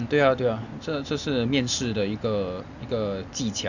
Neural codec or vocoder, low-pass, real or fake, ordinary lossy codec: none; 7.2 kHz; real; none